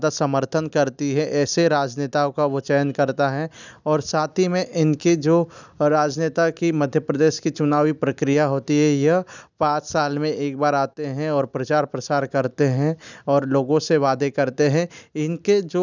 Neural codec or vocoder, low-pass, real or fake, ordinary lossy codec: none; 7.2 kHz; real; none